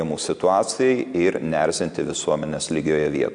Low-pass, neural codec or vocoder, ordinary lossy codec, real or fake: 9.9 kHz; none; MP3, 64 kbps; real